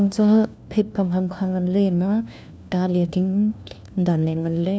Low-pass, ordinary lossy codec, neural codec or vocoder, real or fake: none; none; codec, 16 kHz, 1 kbps, FunCodec, trained on LibriTTS, 50 frames a second; fake